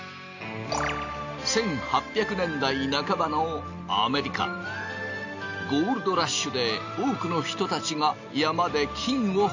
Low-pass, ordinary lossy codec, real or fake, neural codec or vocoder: 7.2 kHz; AAC, 48 kbps; real; none